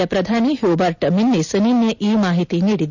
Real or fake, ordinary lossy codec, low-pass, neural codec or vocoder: real; none; 7.2 kHz; none